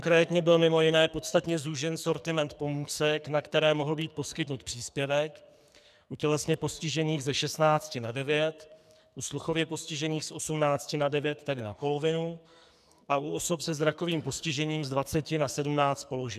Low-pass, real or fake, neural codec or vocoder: 14.4 kHz; fake; codec, 44.1 kHz, 2.6 kbps, SNAC